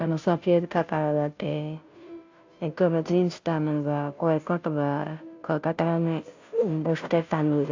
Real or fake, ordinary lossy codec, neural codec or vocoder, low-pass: fake; AAC, 48 kbps; codec, 16 kHz, 0.5 kbps, FunCodec, trained on Chinese and English, 25 frames a second; 7.2 kHz